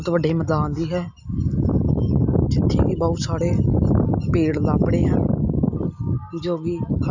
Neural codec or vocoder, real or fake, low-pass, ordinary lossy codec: none; real; 7.2 kHz; none